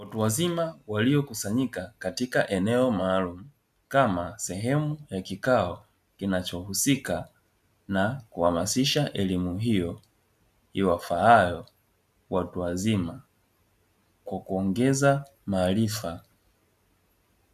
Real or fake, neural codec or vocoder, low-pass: real; none; 14.4 kHz